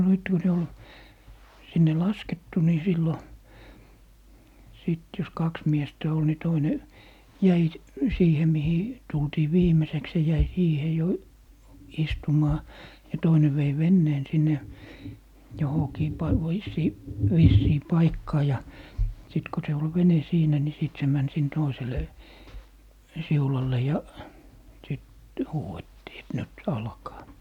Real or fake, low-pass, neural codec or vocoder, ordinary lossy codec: real; 19.8 kHz; none; none